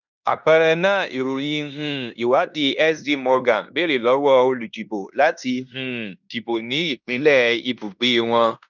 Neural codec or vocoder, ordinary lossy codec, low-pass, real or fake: codec, 16 kHz in and 24 kHz out, 0.9 kbps, LongCat-Audio-Codec, fine tuned four codebook decoder; none; 7.2 kHz; fake